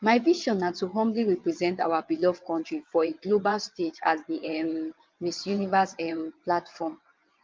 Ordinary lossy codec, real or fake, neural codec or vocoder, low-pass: Opus, 24 kbps; fake; vocoder, 22.05 kHz, 80 mel bands, WaveNeXt; 7.2 kHz